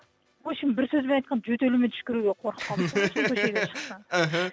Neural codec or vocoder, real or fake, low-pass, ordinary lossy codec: none; real; none; none